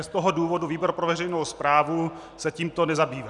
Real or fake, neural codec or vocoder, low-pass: real; none; 10.8 kHz